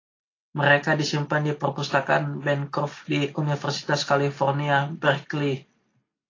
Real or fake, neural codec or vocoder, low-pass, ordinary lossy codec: real; none; 7.2 kHz; AAC, 32 kbps